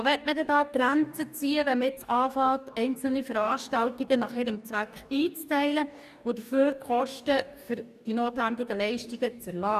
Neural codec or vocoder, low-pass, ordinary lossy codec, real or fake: codec, 44.1 kHz, 2.6 kbps, DAC; 14.4 kHz; none; fake